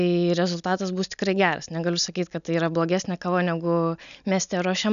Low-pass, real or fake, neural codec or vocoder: 7.2 kHz; real; none